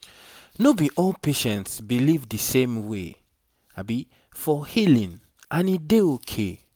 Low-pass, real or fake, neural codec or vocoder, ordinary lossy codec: none; real; none; none